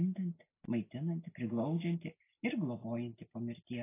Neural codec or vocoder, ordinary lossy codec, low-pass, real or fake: none; AAC, 16 kbps; 3.6 kHz; real